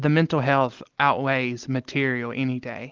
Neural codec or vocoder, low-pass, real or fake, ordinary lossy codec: none; 7.2 kHz; real; Opus, 32 kbps